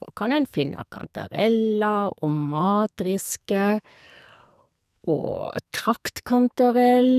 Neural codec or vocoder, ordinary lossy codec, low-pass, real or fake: codec, 44.1 kHz, 2.6 kbps, SNAC; none; 14.4 kHz; fake